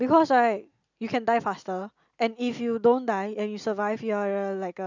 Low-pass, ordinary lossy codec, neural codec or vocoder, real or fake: 7.2 kHz; none; none; real